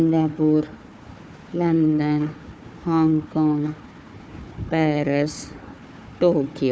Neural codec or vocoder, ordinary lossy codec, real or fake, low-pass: codec, 16 kHz, 4 kbps, FunCodec, trained on Chinese and English, 50 frames a second; none; fake; none